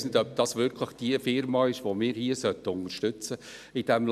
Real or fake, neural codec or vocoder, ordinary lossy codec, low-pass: real; none; none; 14.4 kHz